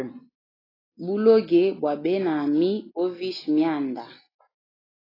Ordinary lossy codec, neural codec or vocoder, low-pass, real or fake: AAC, 24 kbps; none; 5.4 kHz; real